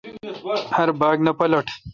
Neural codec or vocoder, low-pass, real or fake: none; 7.2 kHz; real